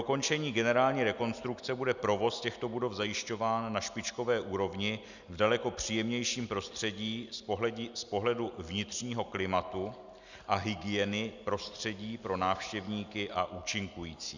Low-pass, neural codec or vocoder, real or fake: 7.2 kHz; none; real